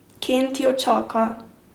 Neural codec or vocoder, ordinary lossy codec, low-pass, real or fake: vocoder, 44.1 kHz, 128 mel bands, Pupu-Vocoder; Opus, 24 kbps; 19.8 kHz; fake